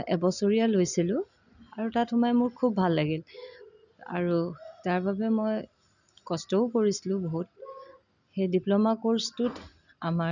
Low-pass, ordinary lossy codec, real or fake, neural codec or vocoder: 7.2 kHz; none; real; none